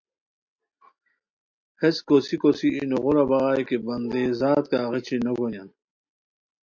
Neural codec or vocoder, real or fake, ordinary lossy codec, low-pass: none; real; MP3, 48 kbps; 7.2 kHz